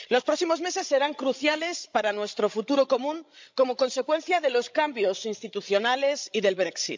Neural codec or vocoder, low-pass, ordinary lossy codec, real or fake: codec, 16 kHz, 16 kbps, FreqCodec, larger model; 7.2 kHz; MP3, 64 kbps; fake